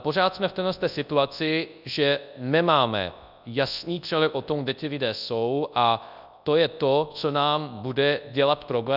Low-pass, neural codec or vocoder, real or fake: 5.4 kHz; codec, 24 kHz, 0.9 kbps, WavTokenizer, large speech release; fake